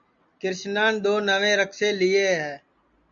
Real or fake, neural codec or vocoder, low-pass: real; none; 7.2 kHz